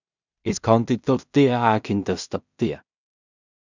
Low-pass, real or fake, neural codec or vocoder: 7.2 kHz; fake; codec, 16 kHz in and 24 kHz out, 0.4 kbps, LongCat-Audio-Codec, two codebook decoder